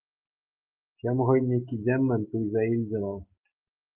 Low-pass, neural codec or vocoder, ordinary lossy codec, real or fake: 3.6 kHz; none; Opus, 32 kbps; real